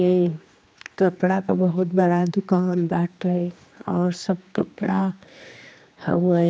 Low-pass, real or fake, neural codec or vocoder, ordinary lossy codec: none; fake; codec, 16 kHz, 2 kbps, X-Codec, HuBERT features, trained on general audio; none